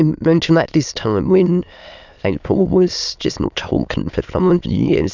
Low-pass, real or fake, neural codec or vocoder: 7.2 kHz; fake; autoencoder, 22.05 kHz, a latent of 192 numbers a frame, VITS, trained on many speakers